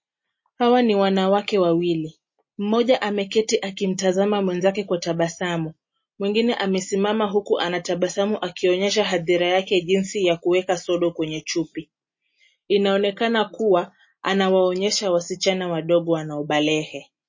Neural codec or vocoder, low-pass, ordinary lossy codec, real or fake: none; 7.2 kHz; MP3, 32 kbps; real